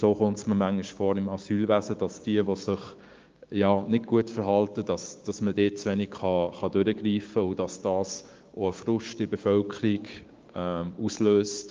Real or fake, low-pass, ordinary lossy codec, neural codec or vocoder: fake; 7.2 kHz; Opus, 32 kbps; codec, 16 kHz, 6 kbps, DAC